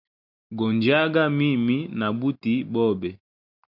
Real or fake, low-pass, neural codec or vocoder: real; 5.4 kHz; none